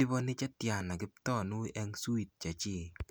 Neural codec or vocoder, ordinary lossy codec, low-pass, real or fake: none; none; none; real